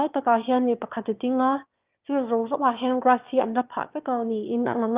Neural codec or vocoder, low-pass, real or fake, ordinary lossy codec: autoencoder, 22.05 kHz, a latent of 192 numbers a frame, VITS, trained on one speaker; 3.6 kHz; fake; Opus, 32 kbps